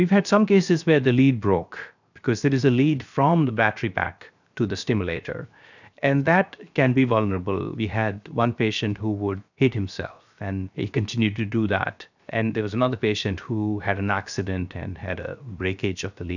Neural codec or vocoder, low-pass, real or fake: codec, 16 kHz, 0.7 kbps, FocalCodec; 7.2 kHz; fake